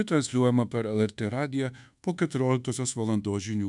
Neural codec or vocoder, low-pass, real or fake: codec, 24 kHz, 1.2 kbps, DualCodec; 10.8 kHz; fake